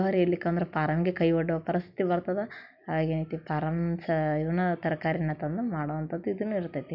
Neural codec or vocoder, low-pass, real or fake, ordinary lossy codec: none; 5.4 kHz; real; none